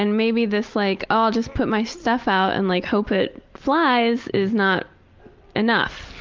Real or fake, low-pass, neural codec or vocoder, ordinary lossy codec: real; 7.2 kHz; none; Opus, 32 kbps